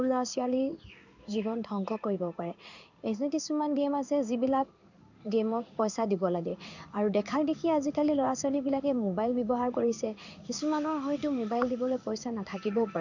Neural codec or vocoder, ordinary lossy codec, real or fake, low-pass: codec, 16 kHz in and 24 kHz out, 1 kbps, XY-Tokenizer; none; fake; 7.2 kHz